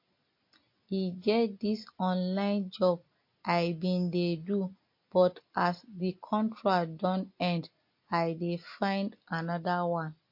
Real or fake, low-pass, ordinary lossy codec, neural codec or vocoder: real; 5.4 kHz; MP3, 32 kbps; none